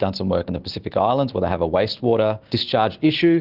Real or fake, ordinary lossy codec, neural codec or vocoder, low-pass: real; Opus, 16 kbps; none; 5.4 kHz